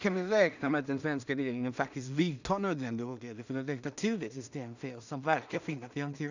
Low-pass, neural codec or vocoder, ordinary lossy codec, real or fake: 7.2 kHz; codec, 16 kHz in and 24 kHz out, 0.4 kbps, LongCat-Audio-Codec, two codebook decoder; none; fake